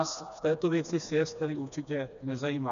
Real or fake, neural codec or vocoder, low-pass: fake; codec, 16 kHz, 2 kbps, FreqCodec, smaller model; 7.2 kHz